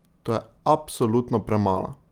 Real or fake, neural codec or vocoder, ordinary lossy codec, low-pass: real; none; Opus, 32 kbps; 19.8 kHz